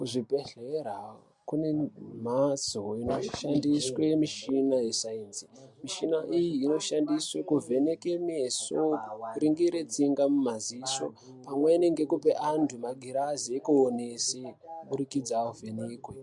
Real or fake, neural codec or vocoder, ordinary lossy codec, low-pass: real; none; MP3, 64 kbps; 10.8 kHz